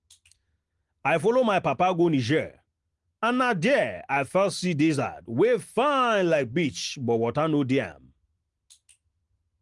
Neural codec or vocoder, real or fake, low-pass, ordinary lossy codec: none; real; 10.8 kHz; Opus, 24 kbps